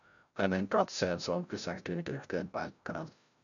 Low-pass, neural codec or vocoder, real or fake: 7.2 kHz; codec, 16 kHz, 0.5 kbps, FreqCodec, larger model; fake